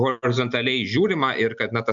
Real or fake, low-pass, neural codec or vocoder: real; 7.2 kHz; none